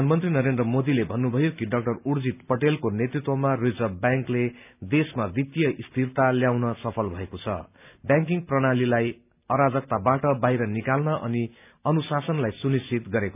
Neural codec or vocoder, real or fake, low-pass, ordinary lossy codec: none; real; 3.6 kHz; none